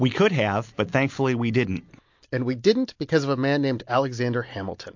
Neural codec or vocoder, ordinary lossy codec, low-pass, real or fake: none; MP3, 48 kbps; 7.2 kHz; real